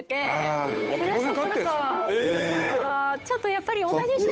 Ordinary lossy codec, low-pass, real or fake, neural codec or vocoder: none; none; fake; codec, 16 kHz, 8 kbps, FunCodec, trained on Chinese and English, 25 frames a second